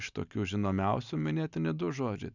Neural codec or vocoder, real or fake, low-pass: none; real; 7.2 kHz